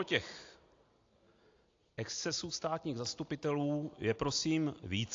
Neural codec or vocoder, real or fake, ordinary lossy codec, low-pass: none; real; AAC, 48 kbps; 7.2 kHz